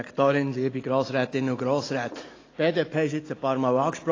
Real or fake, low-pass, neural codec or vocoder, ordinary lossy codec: real; 7.2 kHz; none; AAC, 32 kbps